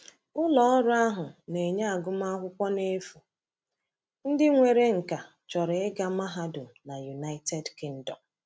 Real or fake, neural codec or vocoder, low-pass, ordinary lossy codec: real; none; none; none